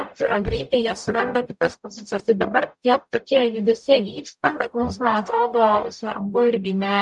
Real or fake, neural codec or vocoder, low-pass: fake; codec, 44.1 kHz, 0.9 kbps, DAC; 10.8 kHz